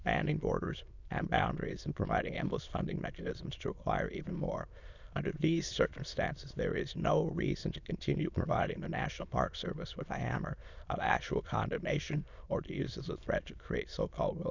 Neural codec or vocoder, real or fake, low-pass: autoencoder, 22.05 kHz, a latent of 192 numbers a frame, VITS, trained on many speakers; fake; 7.2 kHz